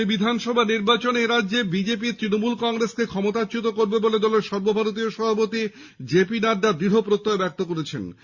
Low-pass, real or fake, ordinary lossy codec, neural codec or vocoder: 7.2 kHz; real; Opus, 64 kbps; none